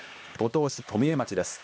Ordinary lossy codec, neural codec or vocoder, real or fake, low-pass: none; codec, 16 kHz, 2 kbps, X-Codec, HuBERT features, trained on LibriSpeech; fake; none